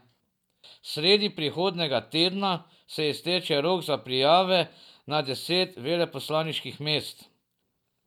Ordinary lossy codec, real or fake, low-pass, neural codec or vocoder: none; real; 19.8 kHz; none